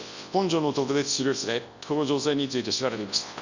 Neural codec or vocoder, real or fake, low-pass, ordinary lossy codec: codec, 24 kHz, 0.9 kbps, WavTokenizer, large speech release; fake; 7.2 kHz; Opus, 64 kbps